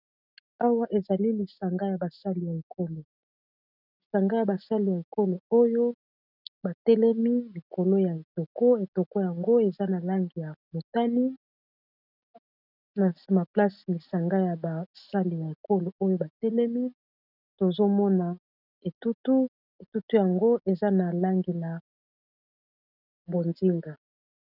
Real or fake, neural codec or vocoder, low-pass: real; none; 5.4 kHz